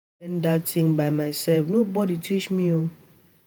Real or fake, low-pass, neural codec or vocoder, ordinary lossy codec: fake; none; vocoder, 48 kHz, 128 mel bands, Vocos; none